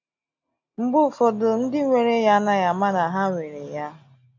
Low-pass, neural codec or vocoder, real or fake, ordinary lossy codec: 7.2 kHz; none; real; MP3, 48 kbps